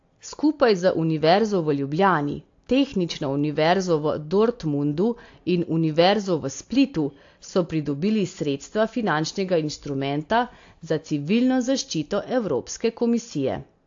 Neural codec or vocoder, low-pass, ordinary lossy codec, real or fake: none; 7.2 kHz; AAC, 48 kbps; real